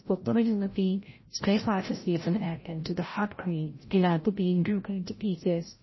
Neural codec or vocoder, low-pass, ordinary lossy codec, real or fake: codec, 16 kHz, 0.5 kbps, FreqCodec, larger model; 7.2 kHz; MP3, 24 kbps; fake